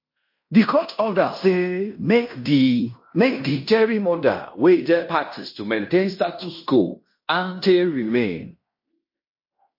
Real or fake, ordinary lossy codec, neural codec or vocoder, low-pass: fake; MP3, 32 kbps; codec, 16 kHz in and 24 kHz out, 0.9 kbps, LongCat-Audio-Codec, fine tuned four codebook decoder; 5.4 kHz